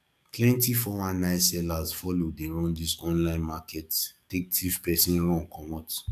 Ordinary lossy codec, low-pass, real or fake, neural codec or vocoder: none; 14.4 kHz; fake; codec, 44.1 kHz, 7.8 kbps, DAC